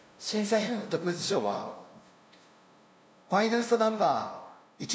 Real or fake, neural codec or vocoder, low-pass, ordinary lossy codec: fake; codec, 16 kHz, 0.5 kbps, FunCodec, trained on LibriTTS, 25 frames a second; none; none